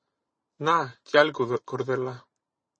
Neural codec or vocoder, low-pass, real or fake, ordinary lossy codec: vocoder, 44.1 kHz, 128 mel bands every 512 samples, BigVGAN v2; 9.9 kHz; fake; MP3, 32 kbps